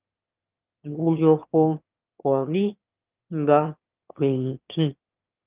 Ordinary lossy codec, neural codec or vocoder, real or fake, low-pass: Opus, 24 kbps; autoencoder, 22.05 kHz, a latent of 192 numbers a frame, VITS, trained on one speaker; fake; 3.6 kHz